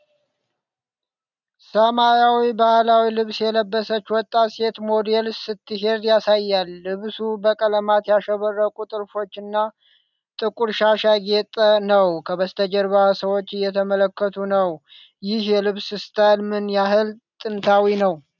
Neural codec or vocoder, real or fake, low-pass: none; real; 7.2 kHz